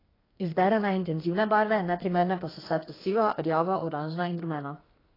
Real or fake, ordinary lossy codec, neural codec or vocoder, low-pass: fake; AAC, 24 kbps; codec, 32 kHz, 1.9 kbps, SNAC; 5.4 kHz